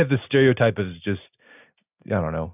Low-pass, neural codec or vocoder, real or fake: 3.6 kHz; none; real